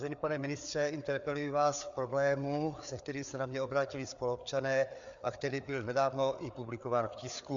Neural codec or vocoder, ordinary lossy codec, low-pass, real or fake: codec, 16 kHz, 4 kbps, FreqCodec, larger model; MP3, 64 kbps; 7.2 kHz; fake